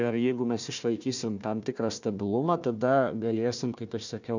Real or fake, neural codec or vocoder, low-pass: fake; codec, 16 kHz, 1 kbps, FunCodec, trained on Chinese and English, 50 frames a second; 7.2 kHz